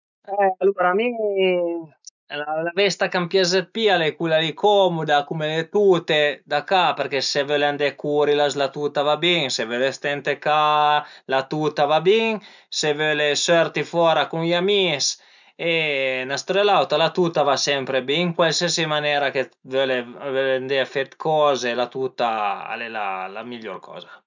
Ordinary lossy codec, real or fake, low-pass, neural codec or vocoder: none; real; 7.2 kHz; none